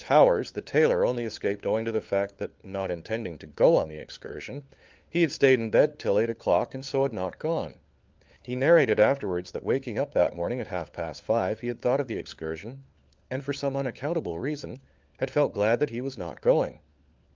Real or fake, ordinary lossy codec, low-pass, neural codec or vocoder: fake; Opus, 32 kbps; 7.2 kHz; codec, 16 kHz, 4 kbps, FunCodec, trained on LibriTTS, 50 frames a second